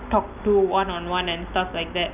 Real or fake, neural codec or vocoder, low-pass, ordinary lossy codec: real; none; 3.6 kHz; none